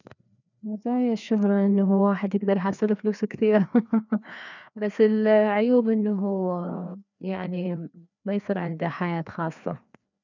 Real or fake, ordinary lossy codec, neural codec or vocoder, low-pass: fake; none; codec, 16 kHz, 2 kbps, FreqCodec, larger model; 7.2 kHz